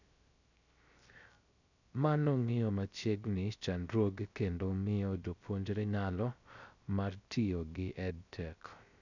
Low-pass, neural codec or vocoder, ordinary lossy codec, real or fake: 7.2 kHz; codec, 16 kHz, 0.3 kbps, FocalCodec; none; fake